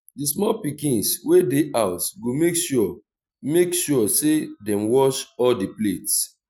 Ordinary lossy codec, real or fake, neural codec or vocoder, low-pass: none; real; none; none